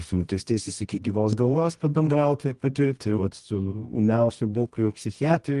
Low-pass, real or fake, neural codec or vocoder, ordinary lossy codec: 10.8 kHz; fake; codec, 24 kHz, 0.9 kbps, WavTokenizer, medium music audio release; Opus, 24 kbps